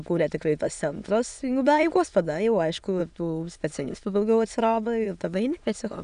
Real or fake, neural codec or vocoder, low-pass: fake; autoencoder, 22.05 kHz, a latent of 192 numbers a frame, VITS, trained on many speakers; 9.9 kHz